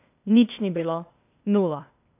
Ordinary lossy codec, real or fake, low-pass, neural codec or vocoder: none; fake; 3.6 kHz; codec, 16 kHz, 0.8 kbps, ZipCodec